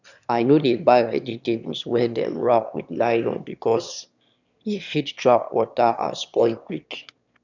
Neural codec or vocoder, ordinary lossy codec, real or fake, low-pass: autoencoder, 22.05 kHz, a latent of 192 numbers a frame, VITS, trained on one speaker; none; fake; 7.2 kHz